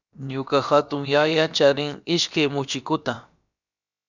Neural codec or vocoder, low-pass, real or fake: codec, 16 kHz, about 1 kbps, DyCAST, with the encoder's durations; 7.2 kHz; fake